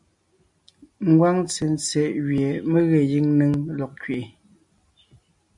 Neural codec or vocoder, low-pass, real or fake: none; 10.8 kHz; real